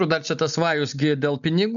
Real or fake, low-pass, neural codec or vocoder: real; 7.2 kHz; none